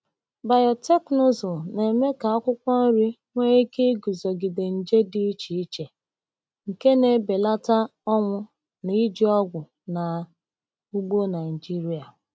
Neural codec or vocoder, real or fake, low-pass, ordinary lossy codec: none; real; none; none